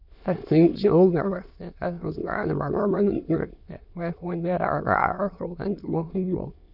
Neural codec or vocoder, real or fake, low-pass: autoencoder, 22.05 kHz, a latent of 192 numbers a frame, VITS, trained on many speakers; fake; 5.4 kHz